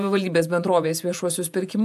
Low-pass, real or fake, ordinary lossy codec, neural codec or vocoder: 14.4 kHz; fake; AAC, 96 kbps; vocoder, 48 kHz, 128 mel bands, Vocos